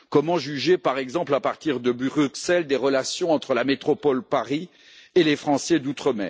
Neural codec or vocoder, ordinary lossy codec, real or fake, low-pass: none; none; real; none